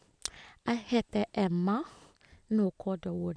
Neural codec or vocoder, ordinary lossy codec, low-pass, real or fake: none; none; 9.9 kHz; real